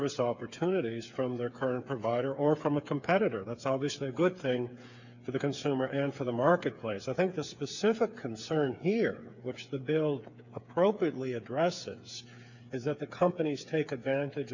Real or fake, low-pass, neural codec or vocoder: fake; 7.2 kHz; codec, 16 kHz, 8 kbps, FreqCodec, smaller model